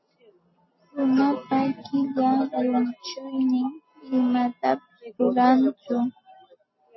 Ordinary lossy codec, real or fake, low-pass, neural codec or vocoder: MP3, 24 kbps; real; 7.2 kHz; none